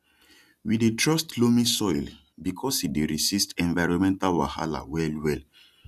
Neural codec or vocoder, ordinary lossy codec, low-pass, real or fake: none; none; 14.4 kHz; real